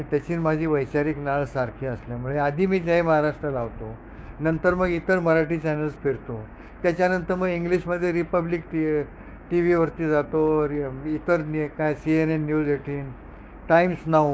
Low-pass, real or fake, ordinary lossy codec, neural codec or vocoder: none; fake; none; codec, 16 kHz, 6 kbps, DAC